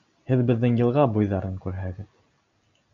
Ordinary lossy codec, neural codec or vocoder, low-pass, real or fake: MP3, 96 kbps; none; 7.2 kHz; real